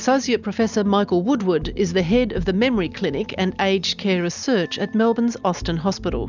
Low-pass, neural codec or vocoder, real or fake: 7.2 kHz; none; real